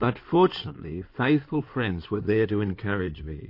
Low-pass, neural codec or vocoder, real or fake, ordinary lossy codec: 5.4 kHz; codec, 16 kHz in and 24 kHz out, 2.2 kbps, FireRedTTS-2 codec; fake; MP3, 32 kbps